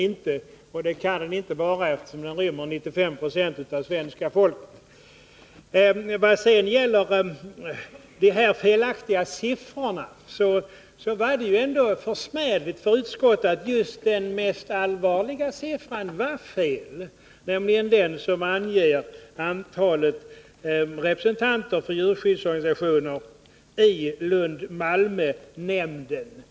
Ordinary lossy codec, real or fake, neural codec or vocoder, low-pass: none; real; none; none